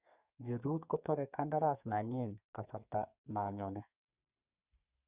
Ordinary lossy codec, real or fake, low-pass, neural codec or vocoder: Opus, 64 kbps; fake; 3.6 kHz; codec, 32 kHz, 1.9 kbps, SNAC